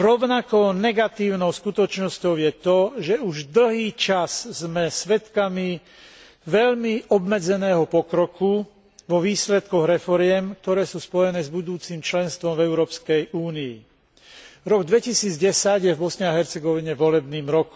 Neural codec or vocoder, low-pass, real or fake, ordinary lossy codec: none; none; real; none